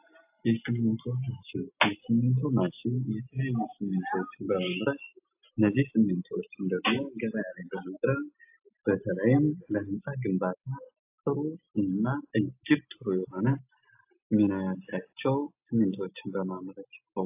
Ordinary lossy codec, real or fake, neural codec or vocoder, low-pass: AAC, 32 kbps; real; none; 3.6 kHz